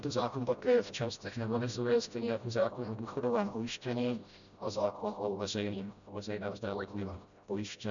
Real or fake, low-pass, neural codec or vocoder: fake; 7.2 kHz; codec, 16 kHz, 0.5 kbps, FreqCodec, smaller model